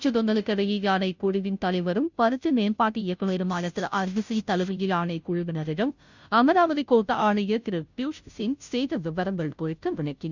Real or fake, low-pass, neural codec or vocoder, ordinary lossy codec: fake; 7.2 kHz; codec, 16 kHz, 0.5 kbps, FunCodec, trained on Chinese and English, 25 frames a second; none